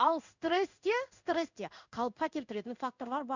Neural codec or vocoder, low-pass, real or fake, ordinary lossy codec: codec, 16 kHz in and 24 kHz out, 1 kbps, XY-Tokenizer; 7.2 kHz; fake; none